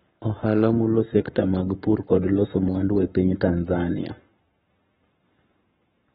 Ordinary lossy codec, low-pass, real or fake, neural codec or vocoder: AAC, 16 kbps; 19.8 kHz; fake; codec, 44.1 kHz, 7.8 kbps, Pupu-Codec